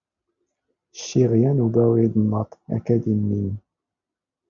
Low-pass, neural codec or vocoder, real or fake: 7.2 kHz; none; real